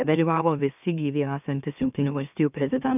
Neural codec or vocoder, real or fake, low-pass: autoencoder, 44.1 kHz, a latent of 192 numbers a frame, MeloTTS; fake; 3.6 kHz